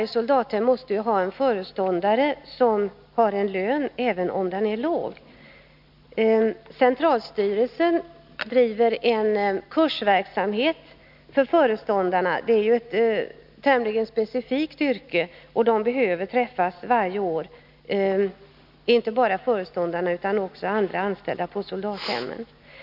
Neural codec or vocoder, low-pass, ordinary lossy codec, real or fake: none; 5.4 kHz; none; real